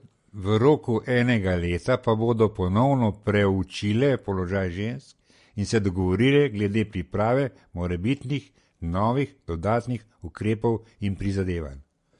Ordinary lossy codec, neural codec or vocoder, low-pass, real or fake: MP3, 48 kbps; none; 14.4 kHz; real